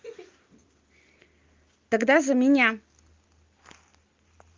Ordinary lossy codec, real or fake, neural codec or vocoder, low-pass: Opus, 32 kbps; real; none; 7.2 kHz